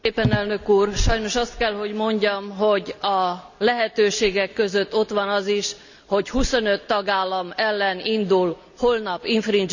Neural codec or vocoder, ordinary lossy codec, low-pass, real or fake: none; none; 7.2 kHz; real